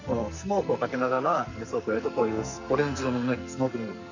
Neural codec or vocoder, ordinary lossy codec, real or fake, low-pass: codec, 44.1 kHz, 2.6 kbps, SNAC; none; fake; 7.2 kHz